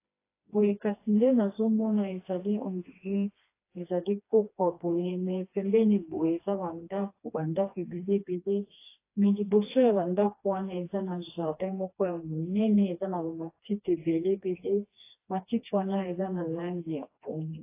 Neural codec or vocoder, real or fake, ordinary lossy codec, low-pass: codec, 16 kHz, 2 kbps, FreqCodec, smaller model; fake; AAC, 24 kbps; 3.6 kHz